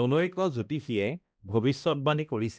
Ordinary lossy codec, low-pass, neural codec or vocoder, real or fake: none; none; codec, 16 kHz, 1 kbps, X-Codec, HuBERT features, trained on balanced general audio; fake